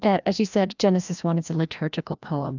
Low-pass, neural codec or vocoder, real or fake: 7.2 kHz; codec, 16 kHz, 1 kbps, FreqCodec, larger model; fake